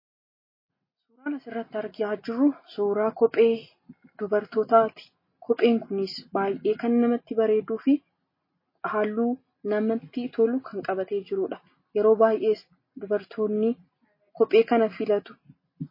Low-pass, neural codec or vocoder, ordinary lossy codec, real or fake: 5.4 kHz; none; MP3, 24 kbps; real